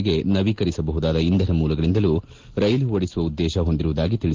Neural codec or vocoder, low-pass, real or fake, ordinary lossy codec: none; 7.2 kHz; real; Opus, 16 kbps